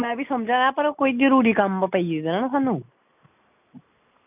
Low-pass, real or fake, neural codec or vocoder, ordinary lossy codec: 3.6 kHz; real; none; none